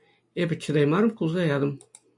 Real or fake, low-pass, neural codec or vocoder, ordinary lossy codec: real; 10.8 kHz; none; AAC, 64 kbps